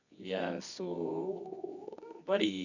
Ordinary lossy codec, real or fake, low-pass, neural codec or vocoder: none; fake; 7.2 kHz; codec, 24 kHz, 0.9 kbps, WavTokenizer, medium music audio release